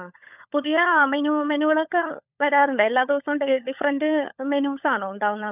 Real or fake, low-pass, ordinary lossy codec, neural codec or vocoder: fake; 3.6 kHz; none; codec, 16 kHz, 16 kbps, FunCodec, trained on LibriTTS, 50 frames a second